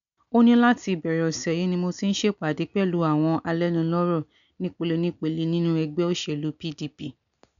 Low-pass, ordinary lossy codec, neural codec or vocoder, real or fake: 7.2 kHz; none; none; real